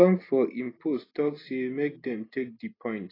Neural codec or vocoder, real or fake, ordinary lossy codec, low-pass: none; real; AAC, 24 kbps; 5.4 kHz